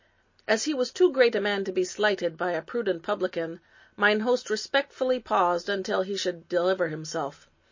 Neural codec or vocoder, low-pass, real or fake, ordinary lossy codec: none; 7.2 kHz; real; MP3, 32 kbps